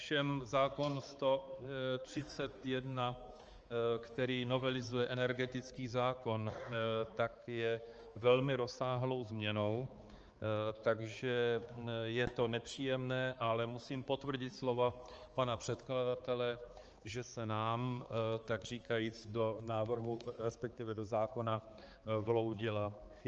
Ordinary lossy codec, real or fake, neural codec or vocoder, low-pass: Opus, 24 kbps; fake; codec, 16 kHz, 4 kbps, X-Codec, HuBERT features, trained on balanced general audio; 7.2 kHz